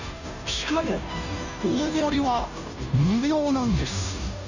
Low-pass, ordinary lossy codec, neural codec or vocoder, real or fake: 7.2 kHz; none; codec, 16 kHz, 0.5 kbps, FunCodec, trained on Chinese and English, 25 frames a second; fake